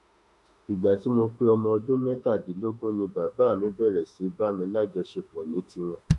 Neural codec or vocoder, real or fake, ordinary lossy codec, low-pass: autoencoder, 48 kHz, 32 numbers a frame, DAC-VAE, trained on Japanese speech; fake; none; 10.8 kHz